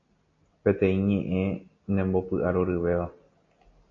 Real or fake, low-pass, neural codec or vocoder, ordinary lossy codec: real; 7.2 kHz; none; AAC, 32 kbps